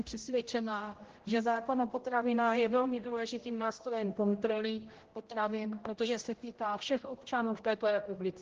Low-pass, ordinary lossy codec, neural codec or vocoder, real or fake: 7.2 kHz; Opus, 16 kbps; codec, 16 kHz, 0.5 kbps, X-Codec, HuBERT features, trained on general audio; fake